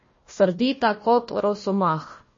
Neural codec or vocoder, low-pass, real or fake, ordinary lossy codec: codec, 16 kHz, 1 kbps, FunCodec, trained on Chinese and English, 50 frames a second; 7.2 kHz; fake; MP3, 32 kbps